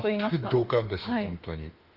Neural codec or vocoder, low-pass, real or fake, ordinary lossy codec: autoencoder, 48 kHz, 32 numbers a frame, DAC-VAE, trained on Japanese speech; 5.4 kHz; fake; Opus, 32 kbps